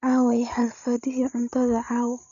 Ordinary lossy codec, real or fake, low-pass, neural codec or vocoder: none; fake; 7.2 kHz; codec, 16 kHz, 16 kbps, FreqCodec, larger model